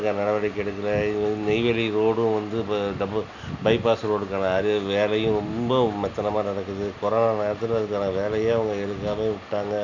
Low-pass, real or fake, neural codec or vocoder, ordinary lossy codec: 7.2 kHz; real; none; none